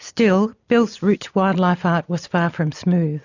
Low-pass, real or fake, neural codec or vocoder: 7.2 kHz; real; none